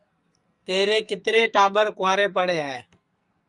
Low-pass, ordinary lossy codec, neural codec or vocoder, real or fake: 10.8 kHz; Opus, 64 kbps; codec, 44.1 kHz, 2.6 kbps, SNAC; fake